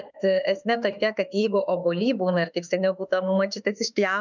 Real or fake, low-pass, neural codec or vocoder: fake; 7.2 kHz; autoencoder, 48 kHz, 32 numbers a frame, DAC-VAE, trained on Japanese speech